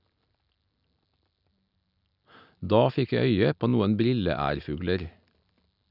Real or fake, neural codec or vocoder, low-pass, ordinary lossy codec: real; none; 5.4 kHz; none